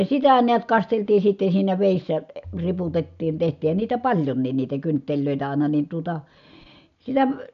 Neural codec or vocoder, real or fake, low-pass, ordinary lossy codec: none; real; 7.2 kHz; none